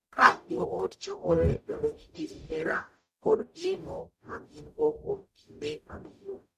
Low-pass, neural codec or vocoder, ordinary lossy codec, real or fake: 14.4 kHz; codec, 44.1 kHz, 0.9 kbps, DAC; none; fake